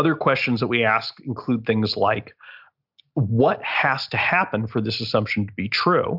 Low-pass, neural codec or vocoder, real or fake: 5.4 kHz; none; real